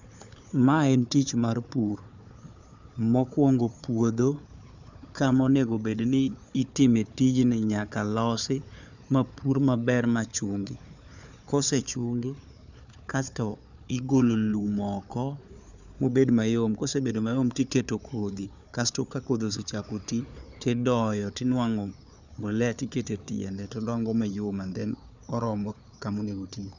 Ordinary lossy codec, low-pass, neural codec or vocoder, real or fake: none; 7.2 kHz; codec, 16 kHz, 4 kbps, FunCodec, trained on Chinese and English, 50 frames a second; fake